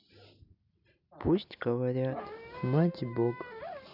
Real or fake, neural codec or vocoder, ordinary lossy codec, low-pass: real; none; none; 5.4 kHz